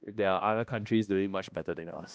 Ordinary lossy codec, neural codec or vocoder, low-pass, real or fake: none; codec, 16 kHz, 1 kbps, X-Codec, HuBERT features, trained on balanced general audio; none; fake